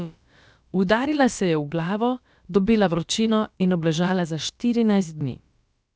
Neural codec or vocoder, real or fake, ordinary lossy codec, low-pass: codec, 16 kHz, about 1 kbps, DyCAST, with the encoder's durations; fake; none; none